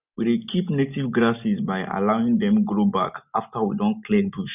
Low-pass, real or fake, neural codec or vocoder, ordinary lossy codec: 3.6 kHz; real; none; none